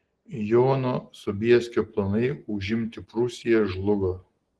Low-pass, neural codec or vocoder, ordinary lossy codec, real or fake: 10.8 kHz; none; Opus, 16 kbps; real